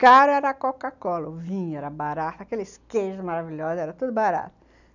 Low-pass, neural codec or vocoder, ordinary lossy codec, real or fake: 7.2 kHz; none; none; real